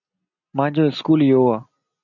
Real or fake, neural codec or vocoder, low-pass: real; none; 7.2 kHz